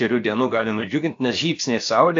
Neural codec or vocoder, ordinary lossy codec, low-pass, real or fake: codec, 16 kHz, about 1 kbps, DyCAST, with the encoder's durations; AAC, 48 kbps; 7.2 kHz; fake